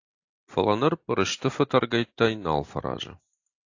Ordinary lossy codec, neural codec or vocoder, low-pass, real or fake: AAC, 48 kbps; none; 7.2 kHz; real